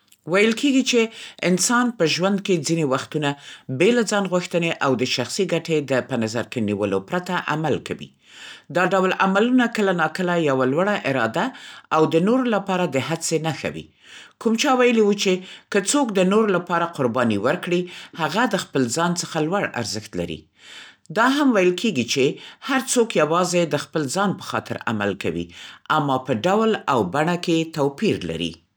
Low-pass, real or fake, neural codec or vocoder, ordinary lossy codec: none; real; none; none